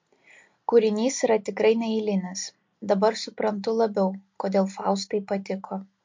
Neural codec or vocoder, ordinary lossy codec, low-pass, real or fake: none; MP3, 48 kbps; 7.2 kHz; real